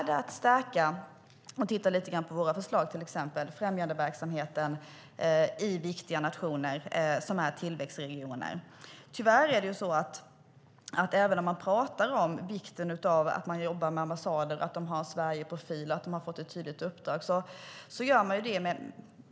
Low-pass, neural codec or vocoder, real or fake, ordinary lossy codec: none; none; real; none